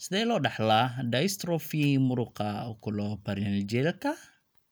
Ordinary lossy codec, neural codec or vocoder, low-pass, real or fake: none; none; none; real